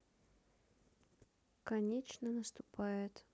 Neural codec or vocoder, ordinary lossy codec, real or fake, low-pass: none; none; real; none